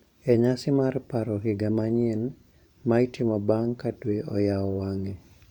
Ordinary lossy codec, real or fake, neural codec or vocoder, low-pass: none; fake; vocoder, 44.1 kHz, 128 mel bands every 512 samples, BigVGAN v2; 19.8 kHz